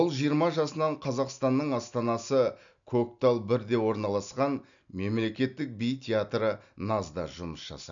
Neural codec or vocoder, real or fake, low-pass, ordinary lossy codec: none; real; 7.2 kHz; none